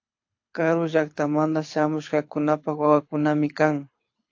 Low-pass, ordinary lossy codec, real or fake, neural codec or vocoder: 7.2 kHz; AAC, 48 kbps; fake; codec, 24 kHz, 6 kbps, HILCodec